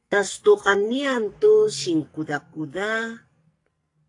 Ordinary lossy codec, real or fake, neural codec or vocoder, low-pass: AAC, 48 kbps; fake; codec, 44.1 kHz, 2.6 kbps, SNAC; 10.8 kHz